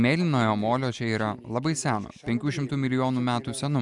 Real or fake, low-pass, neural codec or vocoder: real; 10.8 kHz; none